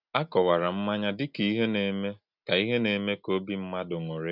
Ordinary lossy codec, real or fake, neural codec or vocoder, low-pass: none; real; none; 5.4 kHz